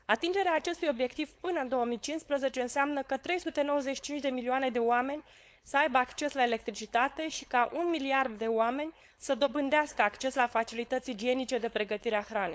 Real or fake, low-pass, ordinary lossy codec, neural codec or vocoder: fake; none; none; codec, 16 kHz, 4.8 kbps, FACodec